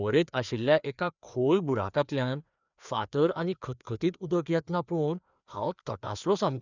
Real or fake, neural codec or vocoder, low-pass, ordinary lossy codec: fake; codec, 16 kHz, 2 kbps, FreqCodec, larger model; 7.2 kHz; none